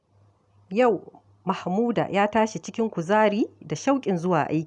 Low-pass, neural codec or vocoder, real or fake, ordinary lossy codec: 10.8 kHz; none; real; none